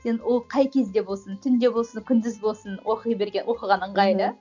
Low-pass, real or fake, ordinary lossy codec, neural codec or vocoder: 7.2 kHz; real; none; none